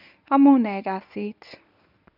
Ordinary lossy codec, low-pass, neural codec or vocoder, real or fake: none; 5.4 kHz; codec, 24 kHz, 0.9 kbps, WavTokenizer, medium speech release version 1; fake